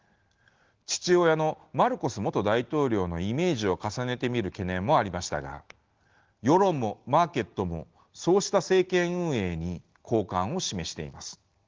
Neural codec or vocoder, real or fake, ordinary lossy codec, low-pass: none; real; Opus, 16 kbps; 7.2 kHz